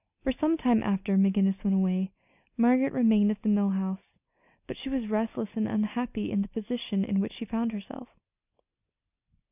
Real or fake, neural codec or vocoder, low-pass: real; none; 3.6 kHz